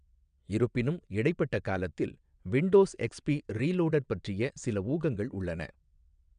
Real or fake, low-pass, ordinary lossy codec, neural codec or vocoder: fake; 9.9 kHz; none; vocoder, 22.05 kHz, 80 mel bands, WaveNeXt